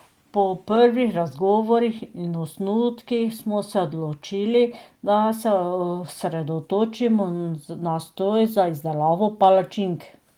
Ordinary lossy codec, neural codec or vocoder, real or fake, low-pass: Opus, 24 kbps; none; real; 19.8 kHz